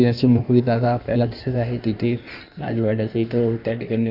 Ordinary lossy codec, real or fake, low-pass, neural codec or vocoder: none; fake; 5.4 kHz; codec, 16 kHz in and 24 kHz out, 1.1 kbps, FireRedTTS-2 codec